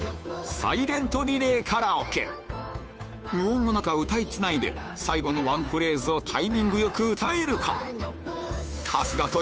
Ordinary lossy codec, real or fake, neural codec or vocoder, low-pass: none; fake; codec, 16 kHz, 2 kbps, FunCodec, trained on Chinese and English, 25 frames a second; none